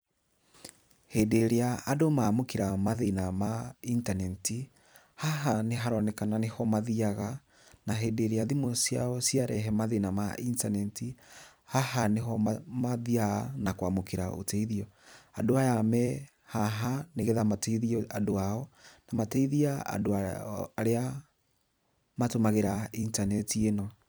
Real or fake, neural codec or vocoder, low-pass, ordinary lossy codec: fake; vocoder, 44.1 kHz, 128 mel bands every 256 samples, BigVGAN v2; none; none